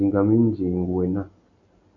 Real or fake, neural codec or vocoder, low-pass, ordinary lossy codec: real; none; 7.2 kHz; MP3, 96 kbps